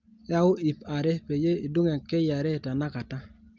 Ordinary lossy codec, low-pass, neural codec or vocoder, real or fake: Opus, 32 kbps; 7.2 kHz; none; real